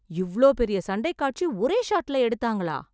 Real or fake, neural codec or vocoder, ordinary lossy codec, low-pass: real; none; none; none